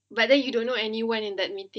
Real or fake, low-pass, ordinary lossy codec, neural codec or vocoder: real; none; none; none